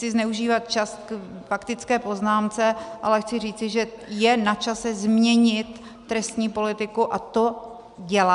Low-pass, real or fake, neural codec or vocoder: 10.8 kHz; real; none